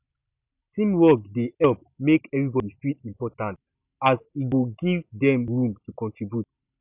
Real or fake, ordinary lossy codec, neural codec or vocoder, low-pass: real; none; none; 3.6 kHz